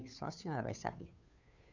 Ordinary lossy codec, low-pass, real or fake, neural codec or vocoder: none; 7.2 kHz; fake; codec, 16 kHz, 2 kbps, FunCodec, trained on Chinese and English, 25 frames a second